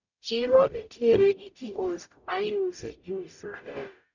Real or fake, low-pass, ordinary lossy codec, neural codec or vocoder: fake; 7.2 kHz; none; codec, 44.1 kHz, 0.9 kbps, DAC